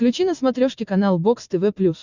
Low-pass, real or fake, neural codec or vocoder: 7.2 kHz; real; none